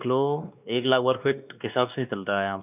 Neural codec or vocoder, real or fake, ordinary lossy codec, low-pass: codec, 16 kHz, 2 kbps, X-Codec, WavLM features, trained on Multilingual LibriSpeech; fake; none; 3.6 kHz